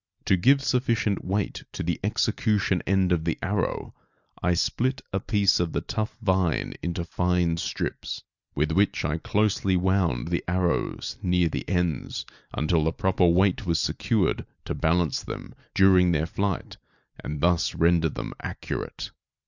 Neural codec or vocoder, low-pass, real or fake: none; 7.2 kHz; real